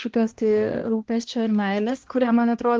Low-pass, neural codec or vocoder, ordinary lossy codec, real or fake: 7.2 kHz; codec, 16 kHz, 1 kbps, X-Codec, HuBERT features, trained on balanced general audio; Opus, 16 kbps; fake